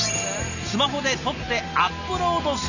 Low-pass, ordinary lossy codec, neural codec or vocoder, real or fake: 7.2 kHz; none; none; real